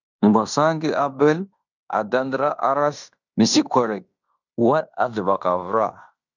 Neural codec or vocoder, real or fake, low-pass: codec, 16 kHz in and 24 kHz out, 0.9 kbps, LongCat-Audio-Codec, fine tuned four codebook decoder; fake; 7.2 kHz